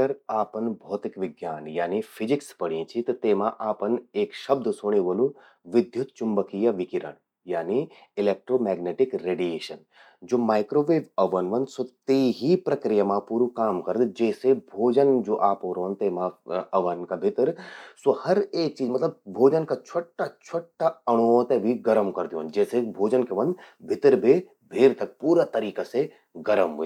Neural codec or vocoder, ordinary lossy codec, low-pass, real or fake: none; none; 19.8 kHz; real